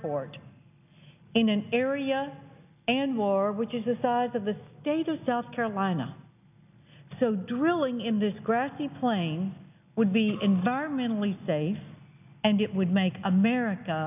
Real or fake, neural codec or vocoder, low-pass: real; none; 3.6 kHz